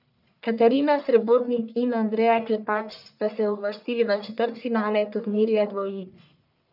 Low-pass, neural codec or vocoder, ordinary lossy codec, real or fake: 5.4 kHz; codec, 44.1 kHz, 1.7 kbps, Pupu-Codec; none; fake